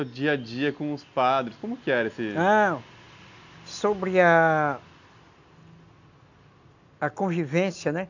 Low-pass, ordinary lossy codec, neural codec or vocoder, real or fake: 7.2 kHz; none; none; real